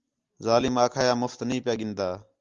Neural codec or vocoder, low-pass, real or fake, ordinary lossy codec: none; 7.2 kHz; real; Opus, 32 kbps